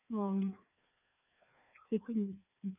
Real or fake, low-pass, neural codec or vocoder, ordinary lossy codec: fake; 3.6 kHz; codec, 16 kHz, 2 kbps, FreqCodec, larger model; none